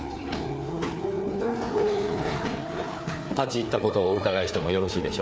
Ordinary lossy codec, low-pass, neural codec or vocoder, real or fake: none; none; codec, 16 kHz, 4 kbps, FreqCodec, larger model; fake